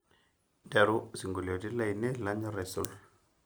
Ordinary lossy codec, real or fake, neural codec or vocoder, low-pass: none; real; none; none